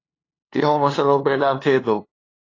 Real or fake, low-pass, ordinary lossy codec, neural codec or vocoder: fake; 7.2 kHz; AAC, 32 kbps; codec, 16 kHz, 2 kbps, FunCodec, trained on LibriTTS, 25 frames a second